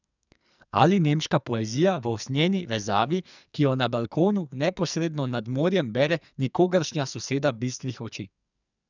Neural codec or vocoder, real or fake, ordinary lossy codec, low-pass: codec, 44.1 kHz, 2.6 kbps, SNAC; fake; none; 7.2 kHz